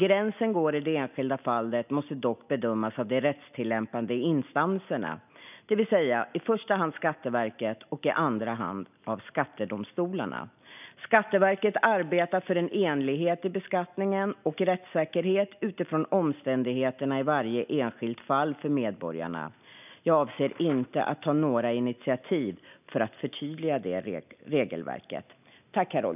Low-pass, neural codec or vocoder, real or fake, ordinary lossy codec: 3.6 kHz; none; real; none